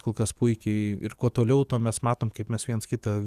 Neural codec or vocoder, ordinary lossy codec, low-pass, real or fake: codec, 44.1 kHz, 7.8 kbps, DAC; Opus, 64 kbps; 14.4 kHz; fake